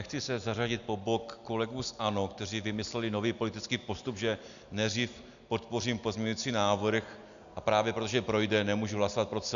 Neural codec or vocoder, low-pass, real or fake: none; 7.2 kHz; real